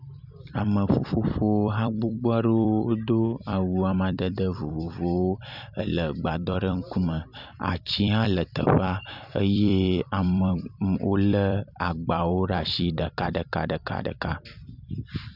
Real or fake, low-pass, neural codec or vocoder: fake; 5.4 kHz; vocoder, 44.1 kHz, 128 mel bands every 256 samples, BigVGAN v2